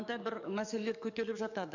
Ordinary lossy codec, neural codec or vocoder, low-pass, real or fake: none; codec, 44.1 kHz, 7.8 kbps, DAC; 7.2 kHz; fake